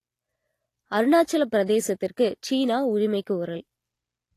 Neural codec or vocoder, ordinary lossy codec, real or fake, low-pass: none; AAC, 48 kbps; real; 14.4 kHz